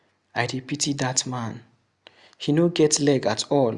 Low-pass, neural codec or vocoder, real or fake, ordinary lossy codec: 10.8 kHz; none; real; Opus, 64 kbps